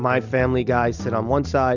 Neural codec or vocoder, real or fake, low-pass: none; real; 7.2 kHz